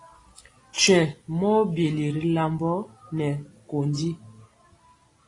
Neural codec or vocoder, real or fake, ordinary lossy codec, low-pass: none; real; AAC, 32 kbps; 10.8 kHz